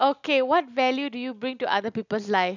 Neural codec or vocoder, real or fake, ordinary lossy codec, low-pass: none; real; none; 7.2 kHz